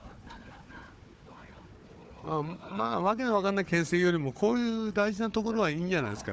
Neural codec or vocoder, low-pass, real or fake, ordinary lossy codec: codec, 16 kHz, 4 kbps, FunCodec, trained on Chinese and English, 50 frames a second; none; fake; none